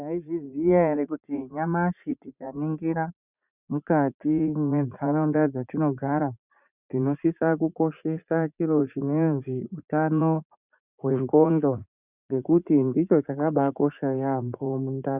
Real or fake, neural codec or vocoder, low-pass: fake; vocoder, 44.1 kHz, 80 mel bands, Vocos; 3.6 kHz